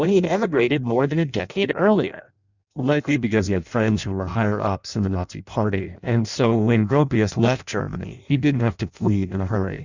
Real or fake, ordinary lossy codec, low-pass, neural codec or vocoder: fake; Opus, 64 kbps; 7.2 kHz; codec, 16 kHz in and 24 kHz out, 0.6 kbps, FireRedTTS-2 codec